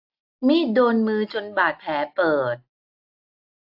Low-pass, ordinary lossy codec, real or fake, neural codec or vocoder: 5.4 kHz; AAC, 48 kbps; real; none